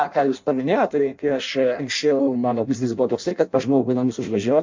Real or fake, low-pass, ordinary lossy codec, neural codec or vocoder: fake; 7.2 kHz; MP3, 64 kbps; codec, 16 kHz in and 24 kHz out, 0.6 kbps, FireRedTTS-2 codec